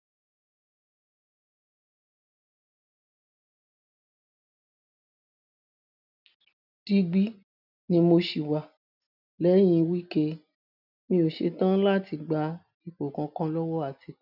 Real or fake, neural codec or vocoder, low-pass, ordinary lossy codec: real; none; 5.4 kHz; none